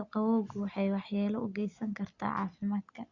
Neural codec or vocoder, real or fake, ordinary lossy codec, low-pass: none; real; Opus, 64 kbps; 7.2 kHz